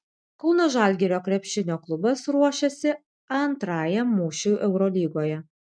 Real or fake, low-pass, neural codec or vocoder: real; 9.9 kHz; none